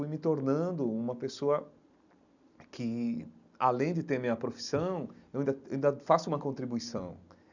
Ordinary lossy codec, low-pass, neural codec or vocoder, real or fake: none; 7.2 kHz; none; real